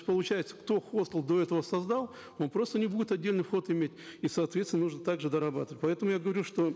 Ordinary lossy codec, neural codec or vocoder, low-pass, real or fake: none; none; none; real